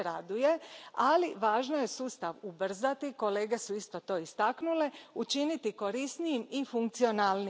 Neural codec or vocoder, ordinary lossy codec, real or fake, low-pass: none; none; real; none